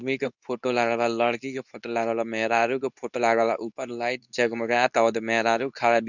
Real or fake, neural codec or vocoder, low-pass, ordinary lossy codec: fake; codec, 24 kHz, 0.9 kbps, WavTokenizer, medium speech release version 2; 7.2 kHz; none